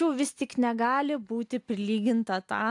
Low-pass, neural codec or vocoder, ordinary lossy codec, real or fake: 10.8 kHz; none; AAC, 64 kbps; real